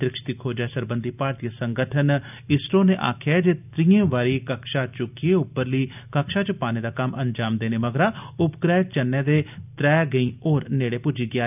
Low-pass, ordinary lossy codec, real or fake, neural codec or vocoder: 3.6 kHz; none; real; none